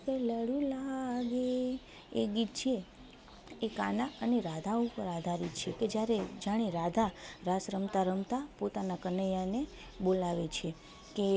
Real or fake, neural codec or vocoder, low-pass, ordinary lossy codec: real; none; none; none